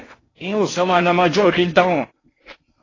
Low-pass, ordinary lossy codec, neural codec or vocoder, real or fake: 7.2 kHz; AAC, 32 kbps; codec, 16 kHz in and 24 kHz out, 0.6 kbps, FocalCodec, streaming, 2048 codes; fake